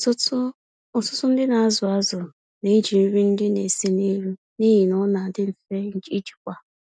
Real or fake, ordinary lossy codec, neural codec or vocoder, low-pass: real; none; none; 9.9 kHz